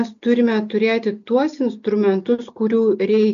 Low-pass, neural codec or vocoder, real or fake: 7.2 kHz; none; real